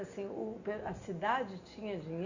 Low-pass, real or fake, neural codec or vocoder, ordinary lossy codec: 7.2 kHz; real; none; Opus, 64 kbps